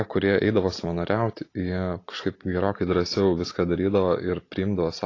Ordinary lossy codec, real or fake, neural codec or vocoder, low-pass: AAC, 32 kbps; real; none; 7.2 kHz